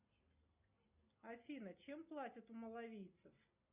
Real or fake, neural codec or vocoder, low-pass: real; none; 3.6 kHz